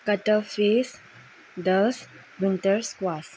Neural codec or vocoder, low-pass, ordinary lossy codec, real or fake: none; none; none; real